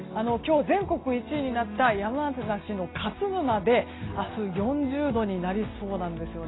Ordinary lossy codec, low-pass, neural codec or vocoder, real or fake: AAC, 16 kbps; 7.2 kHz; none; real